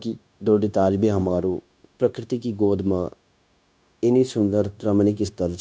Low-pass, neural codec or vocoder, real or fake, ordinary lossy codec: none; codec, 16 kHz, 0.9 kbps, LongCat-Audio-Codec; fake; none